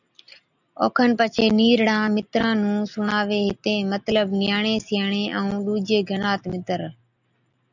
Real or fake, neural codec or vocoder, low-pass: real; none; 7.2 kHz